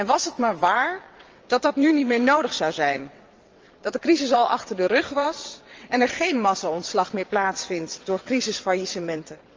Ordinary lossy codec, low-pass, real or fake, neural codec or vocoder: Opus, 24 kbps; 7.2 kHz; fake; vocoder, 22.05 kHz, 80 mel bands, WaveNeXt